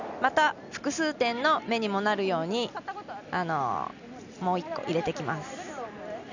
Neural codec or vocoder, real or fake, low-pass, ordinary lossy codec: none; real; 7.2 kHz; none